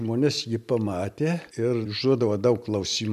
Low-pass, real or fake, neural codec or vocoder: 14.4 kHz; real; none